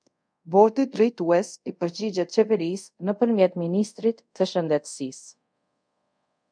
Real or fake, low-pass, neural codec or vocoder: fake; 9.9 kHz; codec, 24 kHz, 0.5 kbps, DualCodec